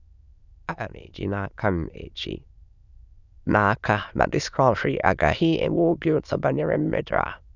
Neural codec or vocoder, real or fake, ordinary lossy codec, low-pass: autoencoder, 22.05 kHz, a latent of 192 numbers a frame, VITS, trained on many speakers; fake; none; 7.2 kHz